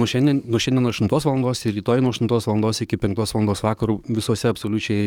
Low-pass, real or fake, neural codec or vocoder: 19.8 kHz; fake; codec, 44.1 kHz, 7.8 kbps, DAC